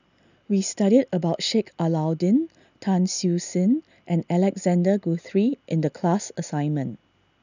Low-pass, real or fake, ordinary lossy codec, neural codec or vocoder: 7.2 kHz; real; none; none